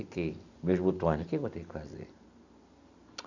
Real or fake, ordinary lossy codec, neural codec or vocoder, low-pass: real; none; none; 7.2 kHz